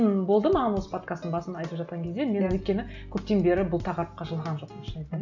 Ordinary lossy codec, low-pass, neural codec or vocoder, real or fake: none; 7.2 kHz; none; real